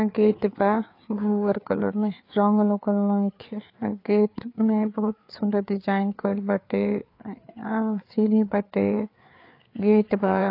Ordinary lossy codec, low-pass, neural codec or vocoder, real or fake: AAC, 32 kbps; 5.4 kHz; codec, 16 kHz, 4 kbps, FreqCodec, larger model; fake